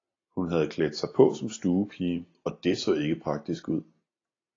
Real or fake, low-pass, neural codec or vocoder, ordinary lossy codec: real; 7.2 kHz; none; AAC, 32 kbps